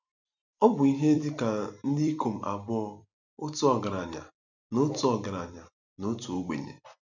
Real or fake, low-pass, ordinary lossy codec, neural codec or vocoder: fake; 7.2 kHz; none; vocoder, 44.1 kHz, 128 mel bands every 256 samples, BigVGAN v2